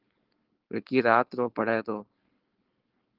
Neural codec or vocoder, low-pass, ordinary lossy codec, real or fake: codec, 16 kHz, 4.8 kbps, FACodec; 5.4 kHz; Opus, 16 kbps; fake